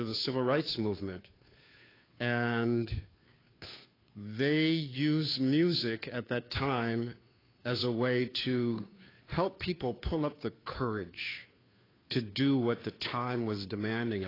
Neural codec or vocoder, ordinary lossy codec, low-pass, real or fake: codec, 16 kHz, 2 kbps, FunCodec, trained on Chinese and English, 25 frames a second; AAC, 24 kbps; 5.4 kHz; fake